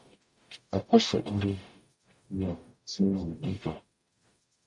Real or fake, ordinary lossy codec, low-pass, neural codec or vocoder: fake; MP3, 48 kbps; 10.8 kHz; codec, 44.1 kHz, 0.9 kbps, DAC